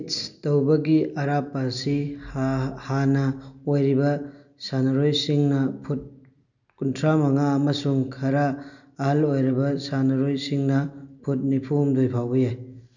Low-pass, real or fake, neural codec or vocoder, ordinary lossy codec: 7.2 kHz; real; none; none